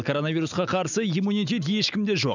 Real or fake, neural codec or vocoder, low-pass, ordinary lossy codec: real; none; 7.2 kHz; none